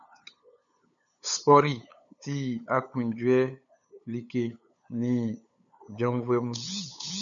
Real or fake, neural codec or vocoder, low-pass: fake; codec, 16 kHz, 8 kbps, FunCodec, trained on LibriTTS, 25 frames a second; 7.2 kHz